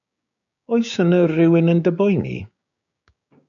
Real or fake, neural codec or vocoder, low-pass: fake; codec, 16 kHz, 6 kbps, DAC; 7.2 kHz